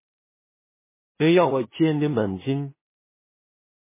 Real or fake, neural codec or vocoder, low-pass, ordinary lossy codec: fake; codec, 16 kHz in and 24 kHz out, 0.4 kbps, LongCat-Audio-Codec, two codebook decoder; 3.6 kHz; MP3, 16 kbps